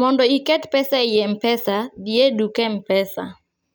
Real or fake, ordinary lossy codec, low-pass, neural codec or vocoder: real; none; none; none